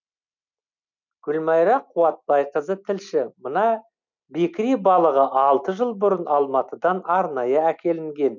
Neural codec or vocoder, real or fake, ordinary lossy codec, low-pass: none; real; none; 7.2 kHz